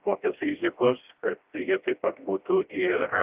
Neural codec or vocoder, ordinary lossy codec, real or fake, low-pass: codec, 16 kHz, 1 kbps, FreqCodec, smaller model; Opus, 32 kbps; fake; 3.6 kHz